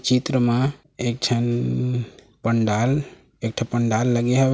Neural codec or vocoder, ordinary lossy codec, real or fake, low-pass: none; none; real; none